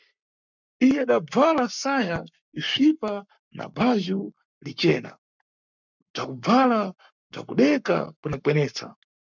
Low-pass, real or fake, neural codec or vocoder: 7.2 kHz; fake; codec, 44.1 kHz, 7.8 kbps, Pupu-Codec